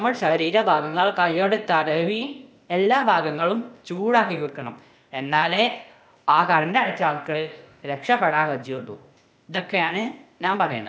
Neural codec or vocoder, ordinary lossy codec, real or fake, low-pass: codec, 16 kHz, 0.8 kbps, ZipCodec; none; fake; none